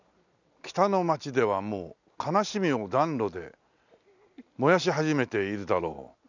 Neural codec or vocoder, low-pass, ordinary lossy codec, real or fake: none; 7.2 kHz; none; real